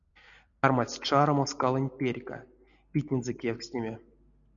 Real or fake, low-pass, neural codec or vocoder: real; 7.2 kHz; none